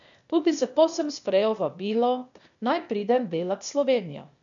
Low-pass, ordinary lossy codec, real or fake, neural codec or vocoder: 7.2 kHz; none; fake; codec, 16 kHz, 0.8 kbps, ZipCodec